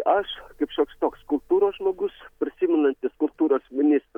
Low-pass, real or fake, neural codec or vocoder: 19.8 kHz; real; none